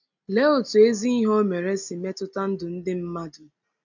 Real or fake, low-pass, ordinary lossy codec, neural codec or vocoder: real; 7.2 kHz; none; none